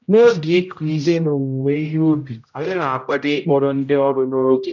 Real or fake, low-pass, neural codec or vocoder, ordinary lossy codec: fake; 7.2 kHz; codec, 16 kHz, 0.5 kbps, X-Codec, HuBERT features, trained on general audio; none